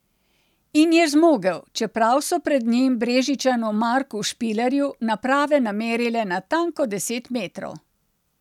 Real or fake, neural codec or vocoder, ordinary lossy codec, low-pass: real; none; none; 19.8 kHz